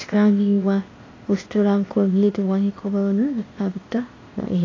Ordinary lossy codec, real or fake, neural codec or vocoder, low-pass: AAC, 32 kbps; fake; codec, 16 kHz, 0.8 kbps, ZipCodec; 7.2 kHz